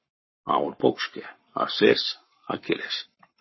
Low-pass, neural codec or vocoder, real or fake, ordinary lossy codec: 7.2 kHz; codec, 44.1 kHz, 7.8 kbps, Pupu-Codec; fake; MP3, 24 kbps